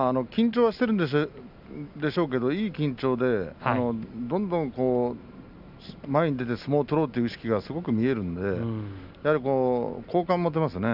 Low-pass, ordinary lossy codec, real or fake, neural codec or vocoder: 5.4 kHz; none; real; none